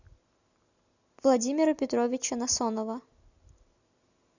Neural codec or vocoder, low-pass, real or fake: none; 7.2 kHz; real